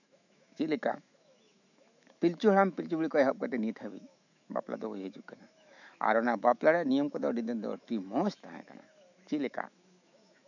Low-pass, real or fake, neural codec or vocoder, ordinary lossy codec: 7.2 kHz; fake; autoencoder, 48 kHz, 128 numbers a frame, DAC-VAE, trained on Japanese speech; none